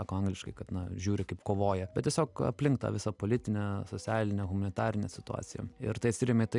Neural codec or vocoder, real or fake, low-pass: none; real; 10.8 kHz